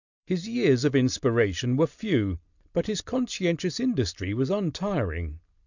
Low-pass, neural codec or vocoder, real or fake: 7.2 kHz; none; real